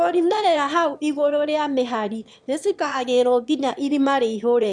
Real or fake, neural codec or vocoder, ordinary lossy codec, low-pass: fake; autoencoder, 22.05 kHz, a latent of 192 numbers a frame, VITS, trained on one speaker; none; 9.9 kHz